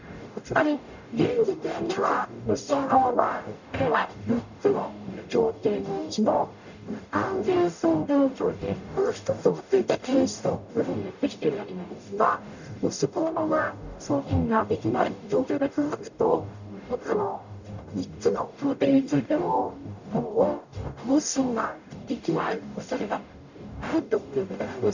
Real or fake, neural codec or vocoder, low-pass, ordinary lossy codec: fake; codec, 44.1 kHz, 0.9 kbps, DAC; 7.2 kHz; none